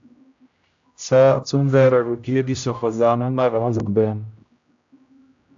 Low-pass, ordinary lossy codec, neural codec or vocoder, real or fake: 7.2 kHz; AAC, 64 kbps; codec, 16 kHz, 0.5 kbps, X-Codec, HuBERT features, trained on general audio; fake